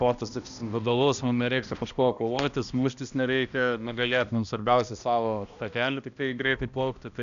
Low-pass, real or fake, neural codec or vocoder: 7.2 kHz; fake; codec, 16 kHz, 1 kbps, X-Codec, HuBERT features, trained on balanced general audio